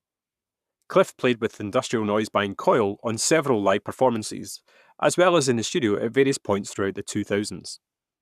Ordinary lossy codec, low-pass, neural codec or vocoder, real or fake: none; 14.4 kHz; codec, 44.1 kHz, 7.8 kbps, Pupu-Codec; fake